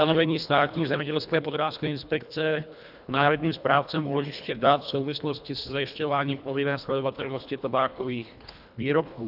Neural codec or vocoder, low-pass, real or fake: codec, 24 kHz, 1.5 kbps, HILCodec; 5.4 kHz; fake